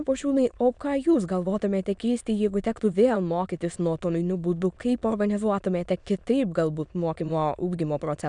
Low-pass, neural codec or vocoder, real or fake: 9.9 kHz; autoencoder, 22.05 kHz, a latent of 192 numbers a frame, VITS, trained on many speakers; fake